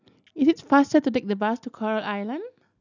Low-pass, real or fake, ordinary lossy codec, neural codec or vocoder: 7.2 kHz; real; none; none